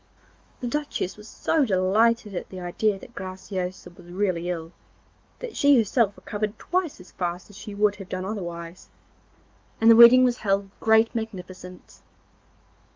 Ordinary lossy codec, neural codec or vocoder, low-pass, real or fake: Opus, 32 kbps; autoencoder, 48 kHz, 128 numbers a frame, DAC-VAE, trained on Japanese speech; 7.2 kHz; fake